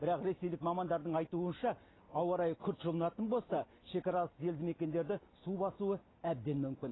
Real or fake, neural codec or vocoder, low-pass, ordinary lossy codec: real; none; 7.2 kHz; AAC, 16 kbps